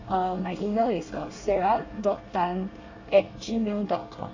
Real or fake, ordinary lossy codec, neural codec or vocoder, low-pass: fake; AAC, 48 kbps; codec, 24 kHz, 1 kbps, SNAC; 7.2 kHz